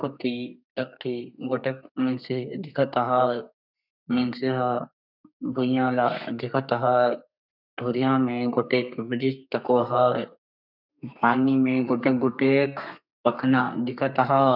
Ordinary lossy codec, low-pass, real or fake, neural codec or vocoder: none; 5.4 kHz; fake; codec, 32 kHz, 1.9 kbps, SNAC